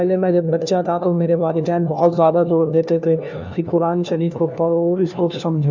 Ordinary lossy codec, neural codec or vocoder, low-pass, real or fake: none; codec, 16 kHz, 1 kbps, FunCodec, trained on LibriTTS, 50 frames a second; 7.2 kHz; fake